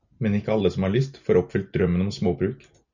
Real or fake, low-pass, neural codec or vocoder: real; 7.2 kHz; none